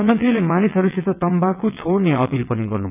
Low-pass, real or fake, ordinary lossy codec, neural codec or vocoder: 3.6 kHz; fake; none; vocoder, 22.05 kHz, 80 mel bands, WaveNeXt